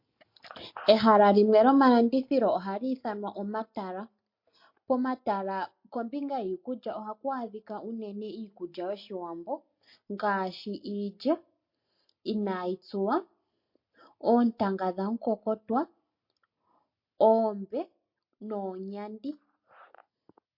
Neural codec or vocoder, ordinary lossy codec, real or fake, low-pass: none; MP3, 32 kbps; real; 5.4 kHz